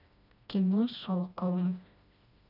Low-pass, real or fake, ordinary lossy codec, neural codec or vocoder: 5.4 kHz; fake; none; codec, 16 kHz, 1 kbps, FreqCodec, smaller model